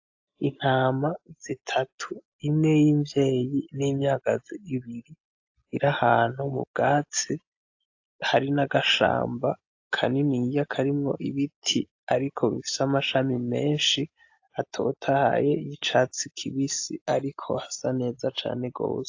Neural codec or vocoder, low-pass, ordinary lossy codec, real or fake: none; 7.2 kHz; AAC, 48 kbps; real